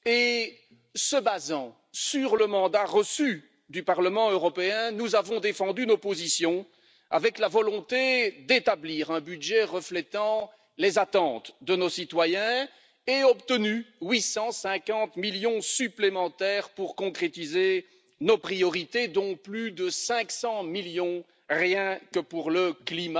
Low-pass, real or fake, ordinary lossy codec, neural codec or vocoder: none; real; none; none